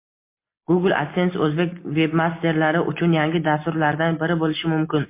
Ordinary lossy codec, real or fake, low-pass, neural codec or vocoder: MP3, 24 kbps; real; 3.6 kHz; none